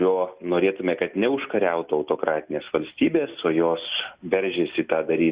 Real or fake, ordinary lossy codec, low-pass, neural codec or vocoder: real; Opus, 64 kbps; 3.6 kHz; none